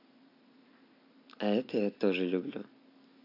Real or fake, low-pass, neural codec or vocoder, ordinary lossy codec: real; 5.4 kHz; none; AAC, 32 kbps